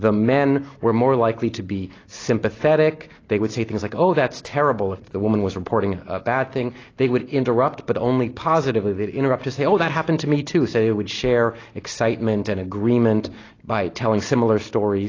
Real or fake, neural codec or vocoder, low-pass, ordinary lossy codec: real; none; 7.2 kHz; AAC, 32 kbps